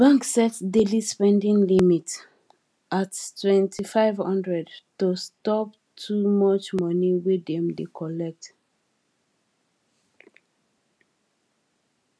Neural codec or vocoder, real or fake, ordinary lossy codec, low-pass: none; real; none; none